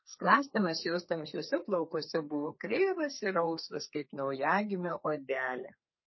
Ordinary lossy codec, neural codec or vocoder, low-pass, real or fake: MP3, 24 kbps; codec, 32 kHz, 1.9 kbps, SNAC; 7.2 kHz; fake